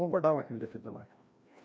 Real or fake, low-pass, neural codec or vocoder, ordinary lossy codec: fake; none; codec, 16 kHz, 1 kbps, FreqCodec, larger model; none